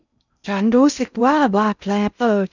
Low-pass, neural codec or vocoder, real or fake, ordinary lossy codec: 7.2 kHz; codec, 16 kHz in and 24 kHz out, 0.6 kbps, FocalCodec, streaming, 2048 codes; fake; none